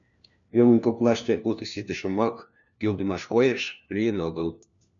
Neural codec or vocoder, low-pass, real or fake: codec, 16 kHz, 1 kbps, FunCodec, trained on LibriTTS, 50 frames a second; 7.2 kHz; fake